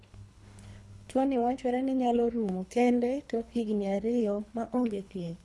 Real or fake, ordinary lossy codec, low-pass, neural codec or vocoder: fake; none; none; codec, 24 kHz, 3 kbps, HILCodec